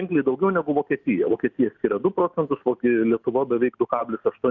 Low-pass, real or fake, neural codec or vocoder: 7.2 kHz; real; none